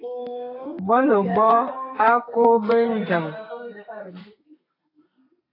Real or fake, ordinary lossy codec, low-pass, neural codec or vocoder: fake; AAC, 32 kbps; 5.4 kHz; codec, 32 kHz, 1.9 kbps, SNAC